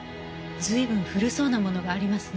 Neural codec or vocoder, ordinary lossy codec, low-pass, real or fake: none; none; none; real